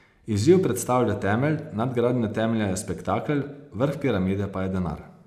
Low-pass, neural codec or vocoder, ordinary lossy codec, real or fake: 14.4 kHz; none; none; real